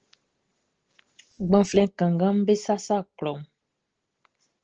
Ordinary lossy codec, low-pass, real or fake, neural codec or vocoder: Opus, 16 kbps; 7.2 kHz; real; none